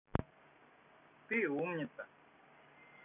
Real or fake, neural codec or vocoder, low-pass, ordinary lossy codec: real; none; 3.6 kHz; none